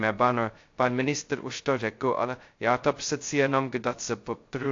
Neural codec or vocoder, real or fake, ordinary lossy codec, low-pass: codec, 16 kHz, 0.2 kbps, FocalCodec; fake; AAC, 48 kbps; 7.2 kHz